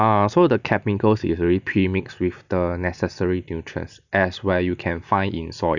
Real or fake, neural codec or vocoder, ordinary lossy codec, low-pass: real; none; none; 7.2 kHz